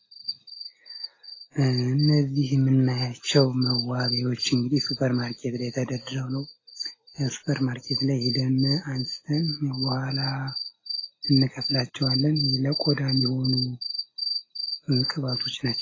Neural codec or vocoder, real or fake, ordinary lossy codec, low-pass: none; real; AAC, 32 kbps; 7.2 kHz